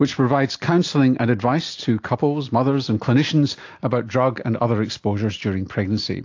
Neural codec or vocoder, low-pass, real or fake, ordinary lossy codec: none; 7.2 kHz; real; AAC, 48 kbps